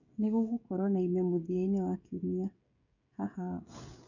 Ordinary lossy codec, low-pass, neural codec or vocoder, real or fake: none; 7.2 kHz; codec, 16 kHz, 16 kbps, FreqCodec, smaller model; fake